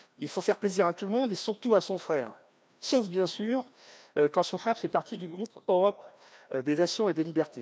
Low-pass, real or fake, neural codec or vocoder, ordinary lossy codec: none; fake; codec, 16 kHz, 1 kbps, FreqCodec, larger model; none